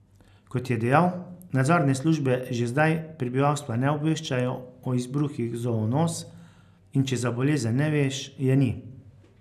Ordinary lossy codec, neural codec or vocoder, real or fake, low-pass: none; none; real; 14.4 kHz